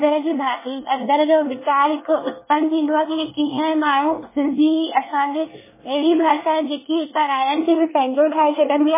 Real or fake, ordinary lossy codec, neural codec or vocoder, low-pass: fake; MP3, 16 kbps; codec, 24 kHz, 1 kbps, SNAC; 3.6 kHz